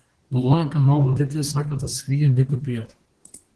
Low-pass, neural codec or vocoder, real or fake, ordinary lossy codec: 10.8 kHz; codec, 24 kHz, 1 kbps, SNAC; fake; Opus, 16 kbps